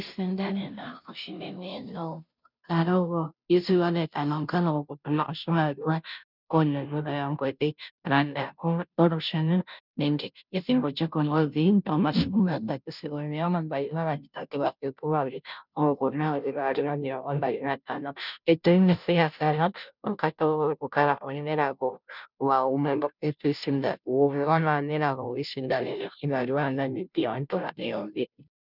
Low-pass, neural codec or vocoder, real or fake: 5.4 kHz; codec, 16 kHz, 0.5 kbps, FunCodec, trained on Chinese and English, 25 frames a second; fake